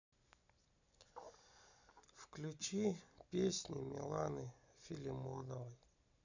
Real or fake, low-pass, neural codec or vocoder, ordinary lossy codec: real; 7.2 kHz; none; none